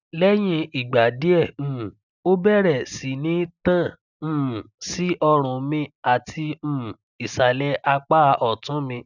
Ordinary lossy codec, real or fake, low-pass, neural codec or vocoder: none; real; 7.2 kHz; none